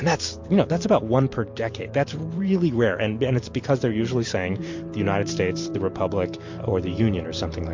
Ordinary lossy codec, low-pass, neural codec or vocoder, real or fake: MP3, 48 kbps; 7.2 kHz; vocoder, 44.1 kHz, 128 mel bands every 512 samples, BigVGAN v2; fake